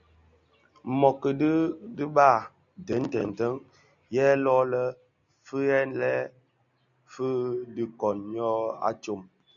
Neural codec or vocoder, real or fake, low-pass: none; real; 7.2 kHz